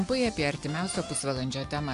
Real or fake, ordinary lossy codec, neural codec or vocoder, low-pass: real; AAC, 64 kbps; none; 10.8 kHz